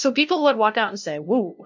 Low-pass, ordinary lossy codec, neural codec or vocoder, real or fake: 7.2 kHz; MP3, 64 kbps; codec, 16 kHz, 0.5 kbps, FunCodec, trained on LibriTTS, 25 frames a second; fake